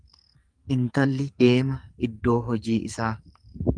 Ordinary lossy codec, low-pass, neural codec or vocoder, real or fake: Opus, 32 kbps; 9.9 kHz; codec, 44.1 kHz, 2.6 kbps, SNAC; fake